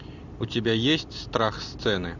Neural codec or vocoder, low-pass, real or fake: none; 7.2 kHz; real